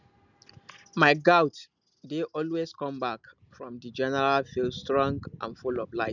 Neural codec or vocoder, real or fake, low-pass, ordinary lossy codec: none; real; 7.2 kHz; none